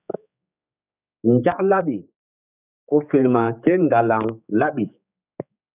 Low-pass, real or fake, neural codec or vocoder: 3.6 kHz; fake; codec, 16 kHz, 4 kbps, X-Codec, HuBERT features, trained on general audio